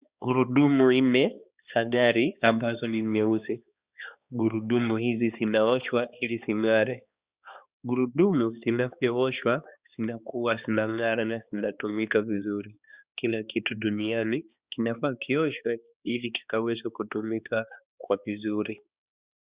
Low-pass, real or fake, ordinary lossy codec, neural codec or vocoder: 3.6 kHz; fake; Opus, 64 kbps; codec, 16 kHz, 2 kbps, X-Codec, HuBERT features, trained on balanced general audio